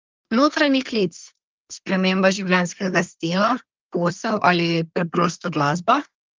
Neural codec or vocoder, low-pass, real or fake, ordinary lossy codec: codec, 24 kHz, 1 kbps, SNAC; 7.2 kHz; fake; Opus, 24 kbps